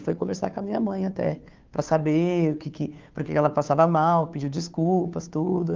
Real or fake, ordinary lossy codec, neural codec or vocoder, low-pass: fake; Opus, 24 kbps; codec, 16 kHz, 2 kbps, FunCodec, trained on Chinese and English, 25 frames a second; 7.2 kHz